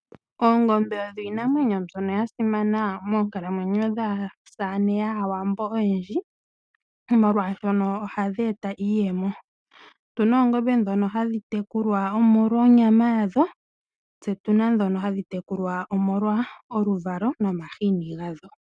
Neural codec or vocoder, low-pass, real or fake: none; 9.9 kHz; real